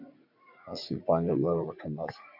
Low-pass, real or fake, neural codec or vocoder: 5.4 kHz; fake; vocoder, 44.1 kHz, 80 mel bands, Vocos